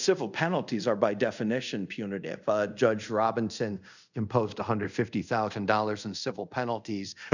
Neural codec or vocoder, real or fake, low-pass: codec, 24 kHz, 0.5 kbps, DualCodec; fake; 7.2 kHz